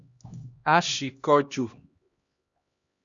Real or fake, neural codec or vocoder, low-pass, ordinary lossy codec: fake; codec, 16 kHz, 1 kbps, X-Codec, HuBERT features, trained on LibriSpeech; 7.2 kHz; Opus, 64 kbps